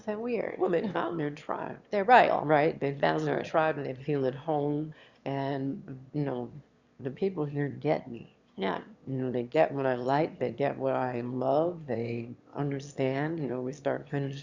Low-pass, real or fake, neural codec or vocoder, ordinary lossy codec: 7.2 kHz; fake; autoencoder, 22.05 kHz, a latent of 192 numbers a frame, VITS, trained on one speaker; Opus, 64 kbps